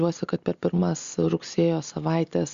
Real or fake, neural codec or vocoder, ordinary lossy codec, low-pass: real; none; Opus, 64 kbps; 7.2 kHz